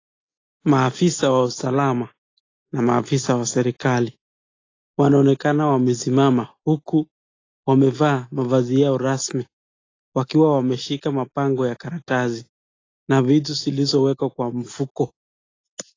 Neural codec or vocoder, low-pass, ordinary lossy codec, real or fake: none; 7.2 kHz; AAC, 32 kbps; real